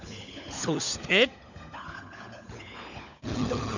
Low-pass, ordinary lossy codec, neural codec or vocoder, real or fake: 7.2 kHz; none; codec, 16 kHz, 16 kbps, FunCodec, trained on LibriTTS, 50 frames a second; fake